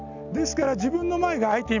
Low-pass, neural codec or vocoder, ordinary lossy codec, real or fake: 7.2 kHz; none; none; real